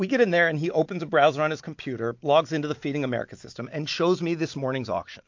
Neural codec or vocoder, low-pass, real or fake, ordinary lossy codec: none; 7.2 kHz; real; MP3, 48 kbps